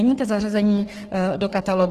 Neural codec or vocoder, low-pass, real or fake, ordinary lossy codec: codec, 44.1 kHz, 3.4 kbps, Pupu-Codec; 14.4 kHz; fake; Opus, 16 kbps